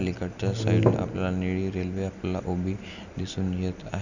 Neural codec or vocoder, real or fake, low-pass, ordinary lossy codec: none; real; 7.2 kHz; none